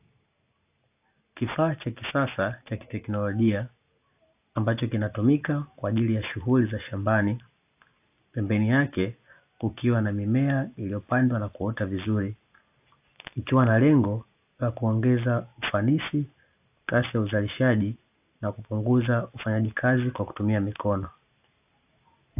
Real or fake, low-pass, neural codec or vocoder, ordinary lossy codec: real; 3.6 kHz; none; AAC, 32 kbps